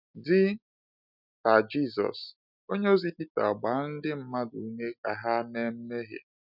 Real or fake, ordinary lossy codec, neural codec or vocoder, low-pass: real; none; none; 5.4 kHz